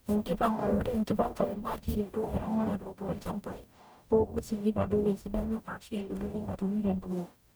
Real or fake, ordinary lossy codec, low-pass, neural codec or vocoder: fake; none; none; codec, 44.1 kHz, 0.9 kbps, DAC